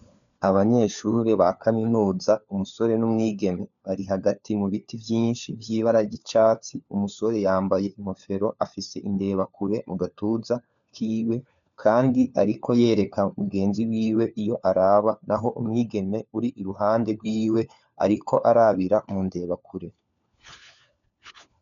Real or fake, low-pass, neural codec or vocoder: fake; 7.2 kHz; codec, 16 kHz, 4 kbps, FunCodec, trained on LibriTTS, 50 frames a second